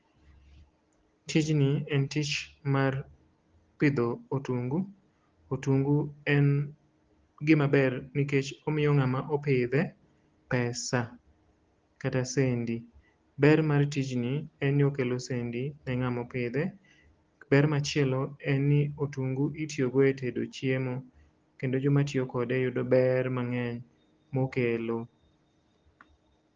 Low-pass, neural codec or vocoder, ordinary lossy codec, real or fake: 7.2 kHz; none; Opus, 16 kbps; real